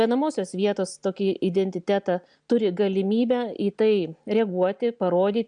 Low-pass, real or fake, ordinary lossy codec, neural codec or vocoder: 9.9 kHz; real; MP3, 96 kbps; none